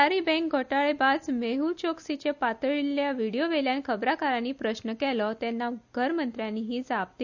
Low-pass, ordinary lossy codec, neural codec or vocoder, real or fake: 7.2 kHz; none; none; real